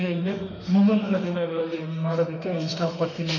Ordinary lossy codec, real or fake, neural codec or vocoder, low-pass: none; fake; autoencoder, 48 kHz, 32 numbers a frame, DAC-VAE, trained on Japanese speech; 7.2 kHz